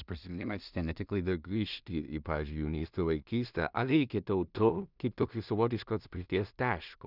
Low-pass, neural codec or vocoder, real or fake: 5.4 kHz; codec, 16 kHz in and 24 kHz out, 0.4 kbps, LongCat-Audio-Codec, two codebook decoder; fake